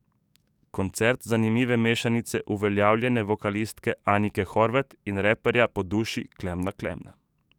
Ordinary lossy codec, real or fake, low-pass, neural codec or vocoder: none; fake; 19.8 kHz; codec, 44.1 kHz, 7.8 kbps, DAC